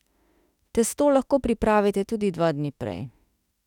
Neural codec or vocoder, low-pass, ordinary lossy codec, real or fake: autoencoder, 48 kHz, 32 numbers a frame, DAC-VAE, trained on Japanese speech; 19.8 kHz; none; fake